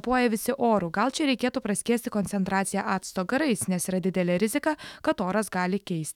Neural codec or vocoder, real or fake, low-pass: autoencoder, 48 kHz, 128 numbers a frame, DAC-VAE, trained on Japanese speech; fake; 19.8 kHz